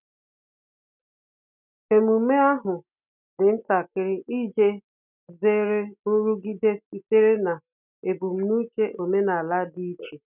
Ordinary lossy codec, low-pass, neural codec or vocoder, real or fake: none; 3.6 kHz; none; real